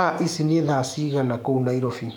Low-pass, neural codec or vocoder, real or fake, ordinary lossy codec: none; codec, 44.1 kHz, 7.8 kbps, DAC; fake; none